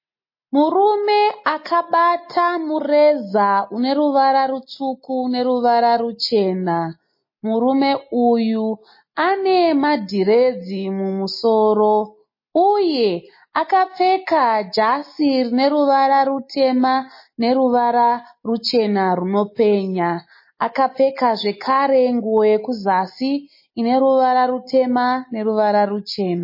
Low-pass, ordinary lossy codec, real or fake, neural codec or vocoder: 5.4 kHz; MP3, 24 kbps; real; none